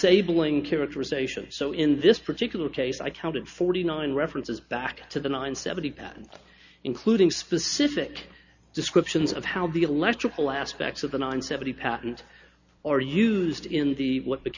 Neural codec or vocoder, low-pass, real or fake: none; 7.2 kHz; real